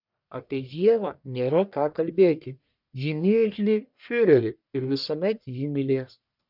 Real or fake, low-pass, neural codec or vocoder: fake; 5.4 kHz; codec, 44.1 kHz, 1.7 kbps, Pupu-Codec